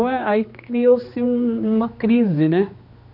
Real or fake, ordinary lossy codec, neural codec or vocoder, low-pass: fake; none; codec, 16 kHz, 2 kbps, X-Codec, HuBERT features, trained on general audio; 5.4 kHz